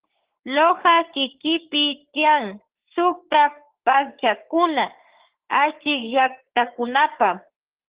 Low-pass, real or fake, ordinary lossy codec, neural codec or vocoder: 3.6 kHz; fake; Opus, 16 kbps; codec, 44.1 kHz, 3.4 kbps, Pupu-Codec